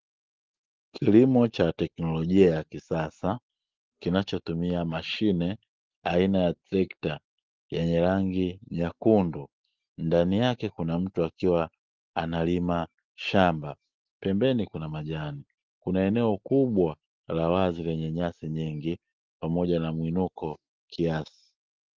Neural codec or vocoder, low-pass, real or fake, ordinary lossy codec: none; 7.2 kHz; real; Opus, 16 kbps